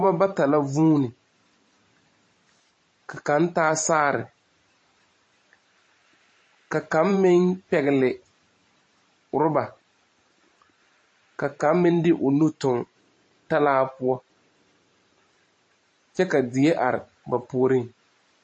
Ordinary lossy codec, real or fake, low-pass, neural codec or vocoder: MP3, 32 kbps; fake; 9.9 kHz; vocoder, 44.1 kHz, 128 mel bands every 512 samples, BigVGAN v2